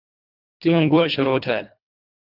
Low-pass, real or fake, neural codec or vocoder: 5.4 kHz; fake; codec, 24 kHz, 1.5 kbps, HILCodec